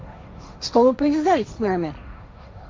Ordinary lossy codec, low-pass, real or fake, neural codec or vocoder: none; none; fake; codec, 16 kHz, 1.1 kbps, Voila-Tokenizer